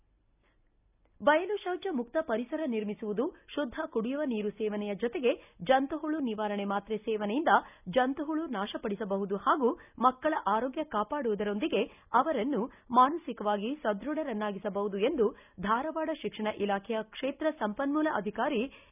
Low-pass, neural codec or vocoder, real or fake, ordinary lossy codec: 3.6 kHz; none; real; none